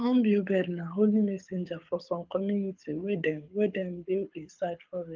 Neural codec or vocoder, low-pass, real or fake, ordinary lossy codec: codec, 24 kHz, 6 kbps, HILCodec; 7.2 kHz; fake; Opus, 24 kbps